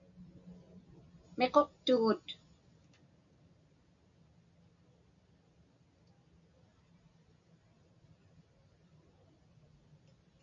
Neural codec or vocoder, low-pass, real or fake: none; 7.2 kHz; real